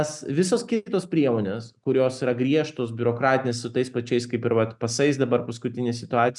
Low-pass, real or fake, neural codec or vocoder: 10.8 kHz; real; none